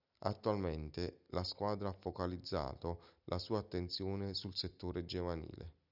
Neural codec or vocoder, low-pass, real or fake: none; 5.4 kHz; real